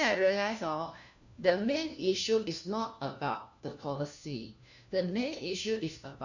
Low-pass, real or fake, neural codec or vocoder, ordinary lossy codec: 7.2 kHz; fake; codec, 16 kHz, 1 kbps, FunCodec, trained on LibriTTS, 50 frames a second; none